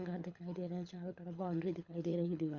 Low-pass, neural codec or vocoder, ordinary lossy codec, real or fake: 7.2 kHz; codec, 24 kHz, 6 kbps, HILCodec; Opus, 64 kbps; fake